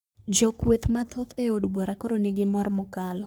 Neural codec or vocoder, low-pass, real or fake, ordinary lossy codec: codec, 44.1 kHz, 3.4 kbps, Pupu-Codec; none; fake; none